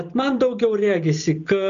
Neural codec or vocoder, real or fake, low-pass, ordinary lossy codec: none; real; 7.2 kHz; AAC, 96 kbps